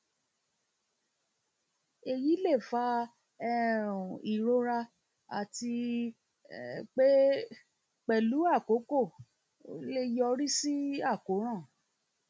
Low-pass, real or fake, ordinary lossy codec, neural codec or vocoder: none; real; none; none